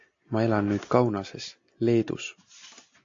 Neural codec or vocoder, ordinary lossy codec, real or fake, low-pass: none; AAC, 48 kbps; real; 7.2 kHz